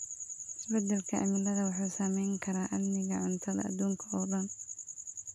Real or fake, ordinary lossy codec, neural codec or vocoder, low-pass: real; none; none; none